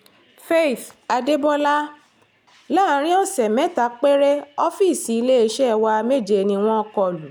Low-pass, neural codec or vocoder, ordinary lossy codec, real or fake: none; none; none; real